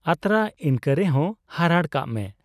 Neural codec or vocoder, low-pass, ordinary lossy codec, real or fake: none; 14.4 kHz; none; real